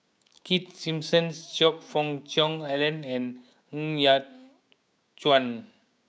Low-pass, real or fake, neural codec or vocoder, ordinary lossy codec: none; fake; codec, 16 kHz, 6 kbps, DAC; none